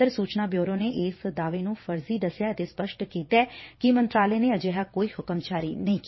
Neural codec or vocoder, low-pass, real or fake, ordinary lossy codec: vocoder, 22.05 kHz, 80 mel bands, WaveNeXt; 7.2 kHz; fake; MP3, 24 kbps